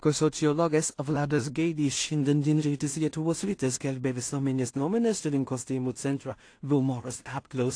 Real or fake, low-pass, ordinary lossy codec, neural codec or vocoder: fake; 9.9 kHz; AAC, 48 kbps; codec, 16 kHz in and 24 kHz out, 0.4 kbps, LongCat-Audio-Codec, two codebook decoder